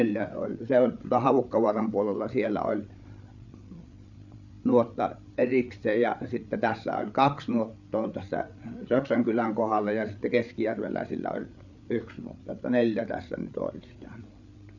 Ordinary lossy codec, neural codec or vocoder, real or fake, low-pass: none; codec, 16 kHz, 8 kbps, FreqCodec, larger model; fake; 7.2 kHz